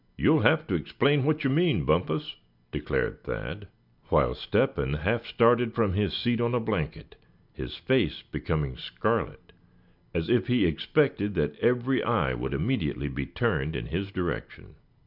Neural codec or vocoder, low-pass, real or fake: none; 5.4 kHz; real